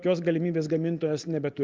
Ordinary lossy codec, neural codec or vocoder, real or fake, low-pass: Opus, 32 kbps; none; real; 7.2 kHz